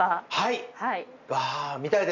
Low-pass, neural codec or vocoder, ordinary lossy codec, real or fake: 7.2 kHz; none; none; real